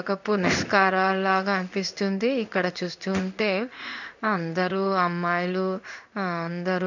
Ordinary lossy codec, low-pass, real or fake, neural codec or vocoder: none; 7.2 kHz; fake; codec, 16 kHz in and 24 kHz out, 1 kbps, XY-Tokenizer